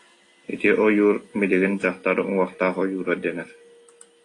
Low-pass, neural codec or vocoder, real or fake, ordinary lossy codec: 10.8 kHz; none; real; AAC, 32 kbps